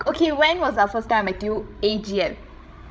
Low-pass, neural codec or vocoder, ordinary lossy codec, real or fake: none; codec, 16 kHz, 16 kbps, FreqCodec, larger model; none; fake